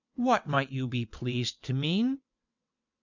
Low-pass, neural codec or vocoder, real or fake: 7.2 kHz; vocoder, 22.05 kHz, 80 mel bands, WaveNeXt; fake